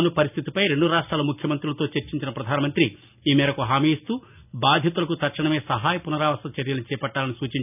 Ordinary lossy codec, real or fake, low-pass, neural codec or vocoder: none; real; 3.6 kHz; none